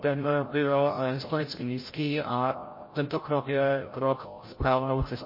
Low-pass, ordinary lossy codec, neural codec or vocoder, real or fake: 5.4 kHz; MP3, 24 kbps; codec, 16 kHz, 0.5 kbps, FreqCodec, larger model; fake